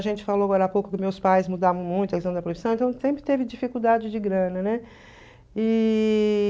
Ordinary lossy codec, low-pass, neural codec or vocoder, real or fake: none; none; none; real